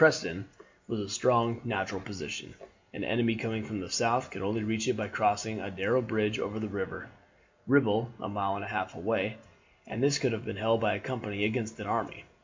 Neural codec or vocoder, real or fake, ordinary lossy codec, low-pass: none; real; MP3, 48 kbps; 7.2 kHz